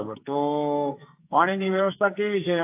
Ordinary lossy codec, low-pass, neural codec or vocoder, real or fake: AAC, 32 kbps; 3.6 kHz; codec, 44.1 kHz, 2.6 kbps, SNAC; fake